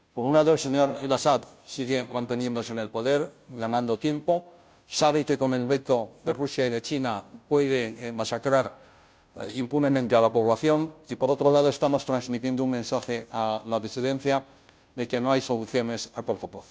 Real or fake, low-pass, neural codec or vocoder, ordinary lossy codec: fake; none; codec, 16 kHz, 0.5 kbps, FunCodec, trained on Chinese and English, 25 frames a second; none